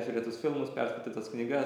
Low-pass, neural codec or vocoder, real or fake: 19.8 kHz; none; real